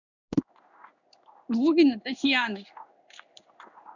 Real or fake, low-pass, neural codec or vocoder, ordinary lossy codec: fake; 7.2 kHz; codec, 16 kHz in and 24 kHz out, 1 kbps, XY-Tokenizer; none